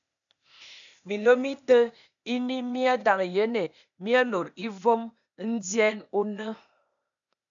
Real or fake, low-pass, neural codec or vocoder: fake; 7.2 kHz; codec, 16 kHz, 0.8 kbps, ZipCodec